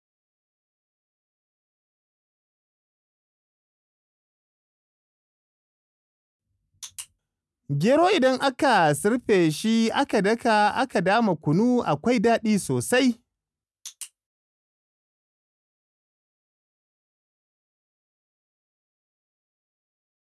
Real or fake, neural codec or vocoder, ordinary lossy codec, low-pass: real; none; none; none